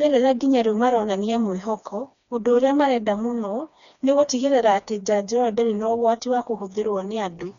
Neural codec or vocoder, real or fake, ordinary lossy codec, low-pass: codec, 16 kHz, 2 kbps, FreqCodec, smaller model; fake; none; 7.2 kHz